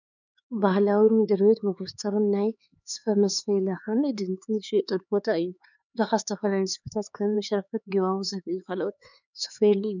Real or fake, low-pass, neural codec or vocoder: fake; 7.2 kHz; codec, 16 kHz, 4 kbps, X-Codec, HuBERT features, trained on LibriSpeech